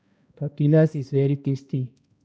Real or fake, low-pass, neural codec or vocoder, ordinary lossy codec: fake; none; codec, 16 kHz, 1 kbps, X-Codec, HuBERT features, trained on balanced general audio; none